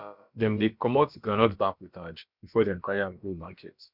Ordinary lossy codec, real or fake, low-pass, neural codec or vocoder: none; fake; 5.4 kHz; codec, 16 kHz, about 1 kbps, DyCAST, with the encoder's durations